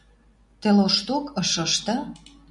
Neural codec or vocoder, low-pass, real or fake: none; 10.8 kHz; real